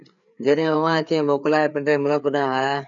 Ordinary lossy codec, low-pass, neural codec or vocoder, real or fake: MP3, 96 kbps; 7.2 kHz; codec, 16 kHz, 4 kbps, FreqCodec, larger model; fake